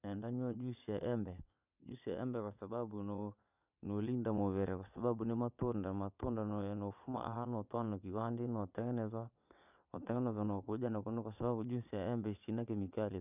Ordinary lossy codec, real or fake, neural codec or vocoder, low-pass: none; fake; vocoder, 22.05 kHz, 80 mel bands, WaveNeXt; 3.6 kHz